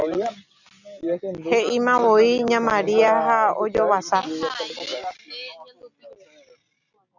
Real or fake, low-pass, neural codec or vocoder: real; 7.2 kHz; none